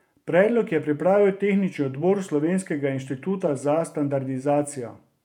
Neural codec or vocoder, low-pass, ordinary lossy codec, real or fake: vocoder, 48 kHz, 128 mel bands, Vocos; 19.8 kHz; none; fake